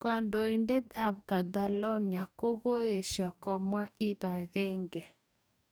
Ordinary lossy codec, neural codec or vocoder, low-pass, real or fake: none; codec, 44.1 kHz, 2.6 kbps, DAC; none; fake